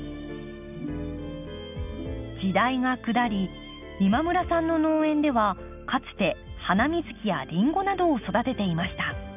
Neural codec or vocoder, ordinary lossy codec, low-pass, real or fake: none; none; 3.6 kHz; real